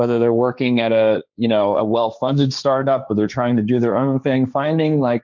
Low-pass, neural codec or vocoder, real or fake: 7.2 kHz; codec, 16 kHz, 1.1 kbps, Voila-Tokenizer; fake